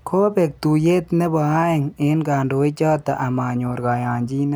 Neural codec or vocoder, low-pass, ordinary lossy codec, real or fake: none; none; none; real